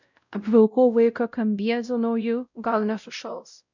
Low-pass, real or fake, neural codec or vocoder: 7.2 kHz; fake; codec, 16 kHz, 0.5 kbps, X-Codec, WavLM features, trained on Multilingual LibriSpeech